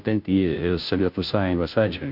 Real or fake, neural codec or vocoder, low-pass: fake; codec, 16 kHz, 0.5 kbps, FunCodec, trained on Chinese and English, 25 frames a second; 5.4 kHz